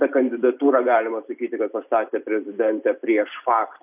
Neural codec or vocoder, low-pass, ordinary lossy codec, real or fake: vocoder, 44.1 kHz, 128 mel bands every 256 samples, BigVGAN v2; 3.6 kHz; AAC, 32 kbps; fake